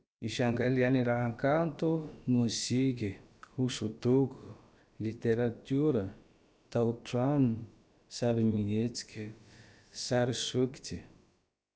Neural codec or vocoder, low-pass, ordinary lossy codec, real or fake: codec, 16 kHz, about 1 kbps, DyCAST, with the encoder's durations; none; none; fake